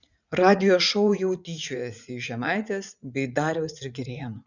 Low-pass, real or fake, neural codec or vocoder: 7.2 kHz; fake; vocoder, 44.1 kHz, 80 mel bands, Vocos